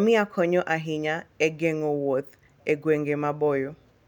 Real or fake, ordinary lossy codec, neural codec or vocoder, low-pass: real; none; none; 19.8 kHz